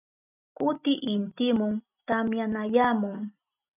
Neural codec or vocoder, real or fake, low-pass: none; real; 3.6 kHz